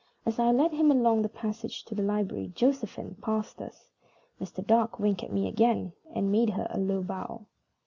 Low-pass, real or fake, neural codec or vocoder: 7.2 kHz; real; none